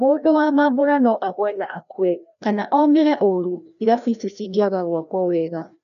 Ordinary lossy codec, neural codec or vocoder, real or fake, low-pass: none; codec, 16 kHz, 1 kbps, FreqCodec, larger model; fake; 7.2 kHz